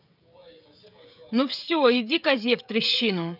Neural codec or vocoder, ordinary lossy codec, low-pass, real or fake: codec, 16 kHz, 16 kbps, FreqCodec, smaller model; none; 5.4 kHz; fake